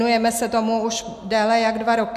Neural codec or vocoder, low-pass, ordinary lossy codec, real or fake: none; 14.4 kHz; MP3, 96 kbps; real